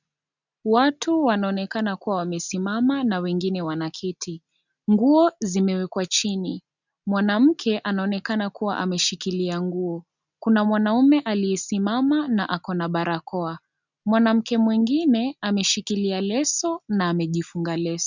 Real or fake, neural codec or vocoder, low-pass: real; none; 7.2 kHz